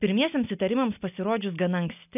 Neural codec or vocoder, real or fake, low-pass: none; real; 3.6 kHz